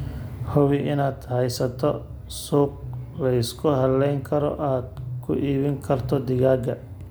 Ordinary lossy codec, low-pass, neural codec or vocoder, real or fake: none; none; none; real